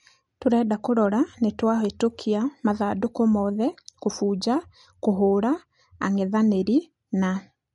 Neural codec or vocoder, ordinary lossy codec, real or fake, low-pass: none; MP3, 48 kbps; real; 19.8 kHz